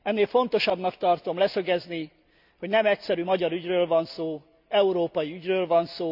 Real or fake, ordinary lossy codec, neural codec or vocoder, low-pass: real; none; none; 5.4 kHz